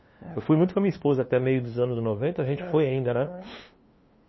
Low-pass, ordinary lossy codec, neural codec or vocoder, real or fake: 7.2 kHz; MP3, 24 kbps; codec, 16 kHz, 2 kbps, FunCodec, trained on LibriTTS, 25 frames a second; fake